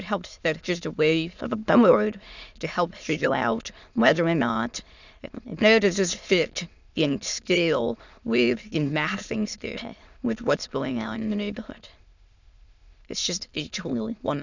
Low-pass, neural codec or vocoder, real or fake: 7.2 kHz; autoencoder, 22.05 kHz, a latent of 192 numbers a frame, VITS, trained on many speakers; fake